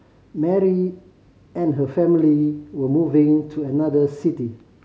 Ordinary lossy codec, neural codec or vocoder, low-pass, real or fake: none; none; none; real